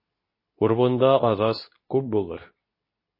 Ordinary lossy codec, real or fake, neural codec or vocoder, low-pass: MP3, 24 kbps; fake; codec, 24 kHz, 0.9 kbps, WavTokenizer, medium speech release version 2; 5.4 kHz